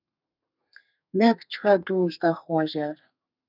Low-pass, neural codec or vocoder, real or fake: 5.4 kHz; codec, 32 kHz, 1.9 kbps, SNAC; fake